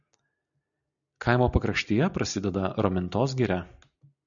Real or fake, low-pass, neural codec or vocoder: real; 7.2 kHz; none